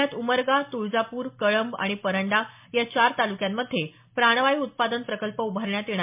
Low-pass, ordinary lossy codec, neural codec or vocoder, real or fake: 3.6 kHz; MP3, 32 kbps; none; real